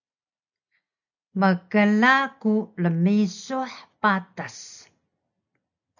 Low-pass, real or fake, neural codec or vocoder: 7.2 kHz; real; none